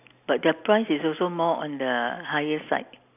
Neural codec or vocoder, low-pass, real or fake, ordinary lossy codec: none; 3.6 kHz; real; none